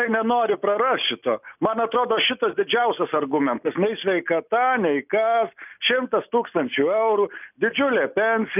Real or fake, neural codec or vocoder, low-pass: real; none; 3.6 kHz